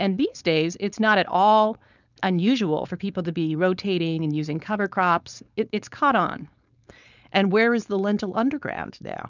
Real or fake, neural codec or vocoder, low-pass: fake; codec, 16 kHz, 4.8 kbps, FACodec; 7.2 kHz